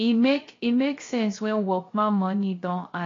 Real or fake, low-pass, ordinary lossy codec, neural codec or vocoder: fake; 7.2 kHz; AAC, 32 kbps; codec, 16 kHz, 0.3 kbps, FocalCodec